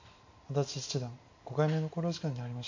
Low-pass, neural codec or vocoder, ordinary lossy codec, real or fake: 7.2 kHz; none; AAC, 48 kbps; real